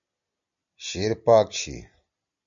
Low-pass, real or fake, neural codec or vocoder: 7.2 kHz; real; none